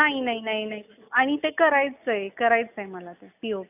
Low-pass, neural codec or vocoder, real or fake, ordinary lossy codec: 3.6 kHz; none; real; none